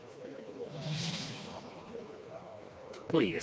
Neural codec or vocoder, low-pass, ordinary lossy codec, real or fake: codec, 16 kHz, 2 kbps, FreqCodec, smaller model; none; none; fake